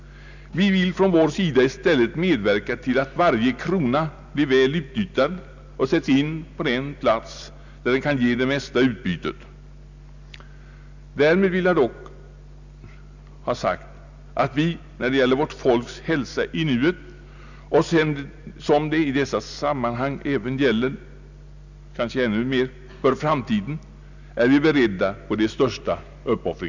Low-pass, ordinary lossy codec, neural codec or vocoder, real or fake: 7.2 kHz; none; none; real